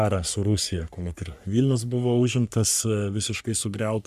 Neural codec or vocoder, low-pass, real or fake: codec, 44.1 kHz, 3.4 kbps, Pupu-Codec; 14.4 kHz; fake